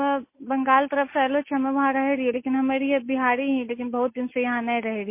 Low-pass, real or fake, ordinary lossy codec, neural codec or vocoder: 3.6 kHz; real; MP3, 24 kbps; none